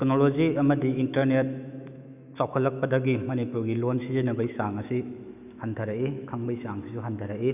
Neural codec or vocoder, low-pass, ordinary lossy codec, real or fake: autoencoder, 48 kHz, 128 numbers a frame, DAC-VAE, trained on Japanese speech; 3.6 kHz; none; fake